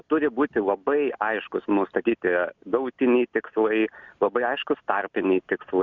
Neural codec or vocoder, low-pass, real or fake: none; 7.2 kHz; real